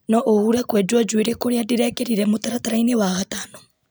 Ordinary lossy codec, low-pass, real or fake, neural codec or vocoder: none; none; real; none